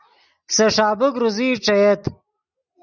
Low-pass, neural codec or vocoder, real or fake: 7.2 kHz; none; real